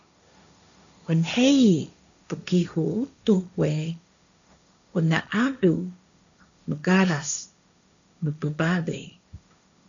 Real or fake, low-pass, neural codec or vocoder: fake; 7.2 kHz; codec, 16 kHz, 1.1 kbps, Voila-Tokenizer